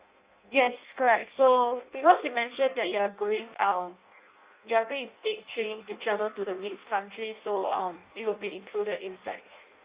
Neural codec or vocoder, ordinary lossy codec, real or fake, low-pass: codec, 16 kHz in and 24 kHz out, 0.6 kbps, FireRedTTS-2 codec; Opus, 64 kbps; fake; 3.6 kHz